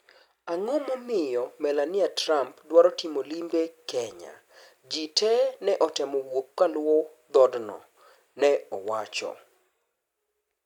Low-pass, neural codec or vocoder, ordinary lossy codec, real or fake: 19.8 kHz; none; none; real